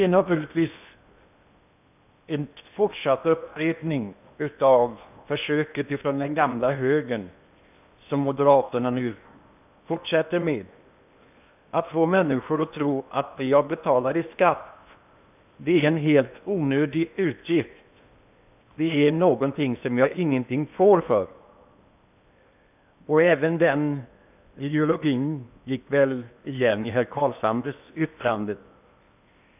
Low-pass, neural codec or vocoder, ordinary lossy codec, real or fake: 3.6 kHz; codec, 16 kHz in and 24 kHz out, 0.8 kbps, FocalCodec, streaming, 65536 codes; none; fake